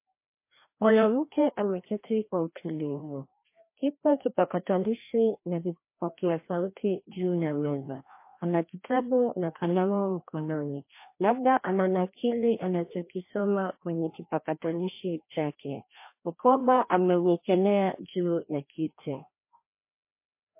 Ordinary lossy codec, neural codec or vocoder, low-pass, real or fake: MP3, 24 kbps; codec, 16 kHz, 1 kbps, FreqCodec, larger model; 3.6 kHz; fake